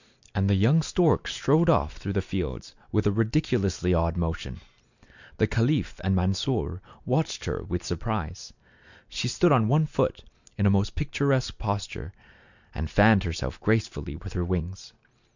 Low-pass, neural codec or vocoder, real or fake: 7.2 kHz; none; real